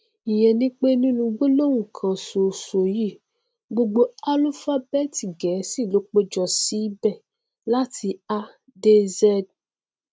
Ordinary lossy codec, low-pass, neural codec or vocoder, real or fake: none; none; none; real